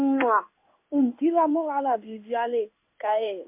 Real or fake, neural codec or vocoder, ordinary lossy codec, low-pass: fake; codec, 16 kHz in and 24 kHz out, 1 kbps, XY-Tokenizer; MP3, 32 kbps; 3.6 kHz